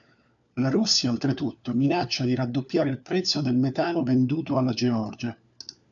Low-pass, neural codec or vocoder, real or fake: 7.2 kHz; codec, 16 kHz, 4 kbps, FunCodec, trained on LibriTTS, 50 frames a second; fake